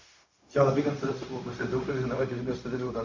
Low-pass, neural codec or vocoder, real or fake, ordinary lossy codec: 7.2 kHz; codec, 16 kHz, 0.4 kbps, LongCat-Audio-Codec; fake; MP3, 48 kbps